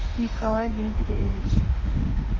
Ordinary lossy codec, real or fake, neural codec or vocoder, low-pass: Opus, 24 kbps; fake; autoencoder, 48 kHz, 32 numbers a frame, DAC-VAE, trained on Japanese speech; 7.2 kHz